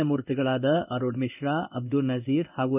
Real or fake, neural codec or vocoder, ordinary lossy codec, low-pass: fake; codec, 16 kHz in and 24 kHz out, 1 kbps, XY-Tokenizer; none; 3.6 kHz